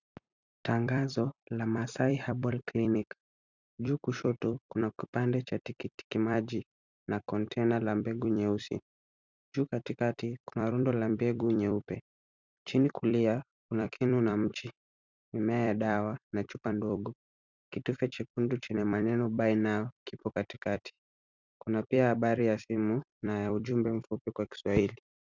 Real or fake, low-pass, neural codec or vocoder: fake; 7.2 kHz; vocoder, 44.1 kHz, 128 mel bands every 256 samples, BigVGAN v2